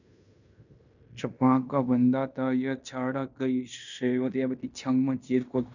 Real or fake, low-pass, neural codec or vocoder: fake; 7.2 kHz; codec, 16 kHz in and 24 kHz out, 0.9 kbps, LongCat-Audio-Codec, fine tuned four codebook decoder